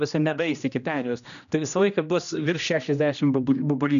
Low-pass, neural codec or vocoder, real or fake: 7.2 kHz; codec, 16 kHz, 1 kbps, X-Codec, HuBERT features, trained on general audio; fake